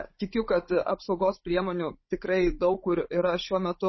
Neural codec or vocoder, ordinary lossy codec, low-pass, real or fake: codec, 16 kHz, 16 kbps, FunCodec, trained on Chinese and English, 50 frames a second; MP3, 24 kbps; 7.2 kHz; fake